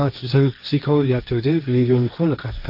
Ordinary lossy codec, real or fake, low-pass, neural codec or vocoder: none; fake; 5.4 kHz; codec, 16 kHz, 1.1 kbps, Voila-Tokenizer